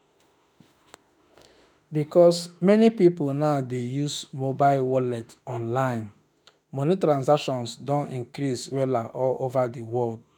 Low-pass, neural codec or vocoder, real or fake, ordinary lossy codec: none; autoencoder, 48 kHz, 32 numbers a frame, DAC-VAE, trained on Japanese speech; fake; none